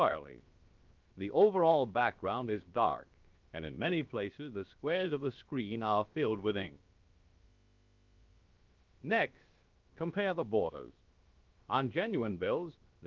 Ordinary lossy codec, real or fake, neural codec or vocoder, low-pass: Opus, 24 kbps; fake; codec, 16 kHz, about 1 kbps, DyCAST, with the encoder's durations; 7.2 kHz